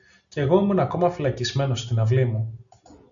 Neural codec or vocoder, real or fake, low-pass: none; real; 7.2 kHz